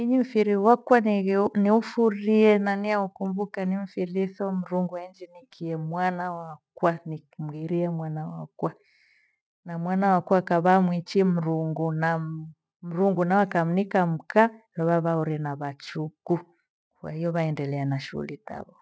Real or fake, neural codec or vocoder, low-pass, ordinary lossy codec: real; none; none; none